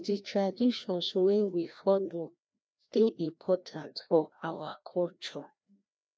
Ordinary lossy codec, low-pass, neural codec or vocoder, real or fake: none; none; codec, 16 kHz, 1 kbps, FreqCodec, larger model; fake